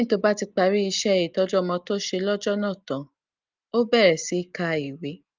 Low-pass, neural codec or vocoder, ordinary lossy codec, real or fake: 7.2 kHz; none; Opus, 32 kbps; real